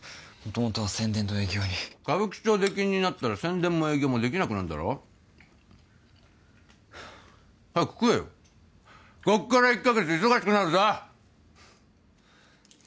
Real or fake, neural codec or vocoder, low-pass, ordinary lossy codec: real; none; none; none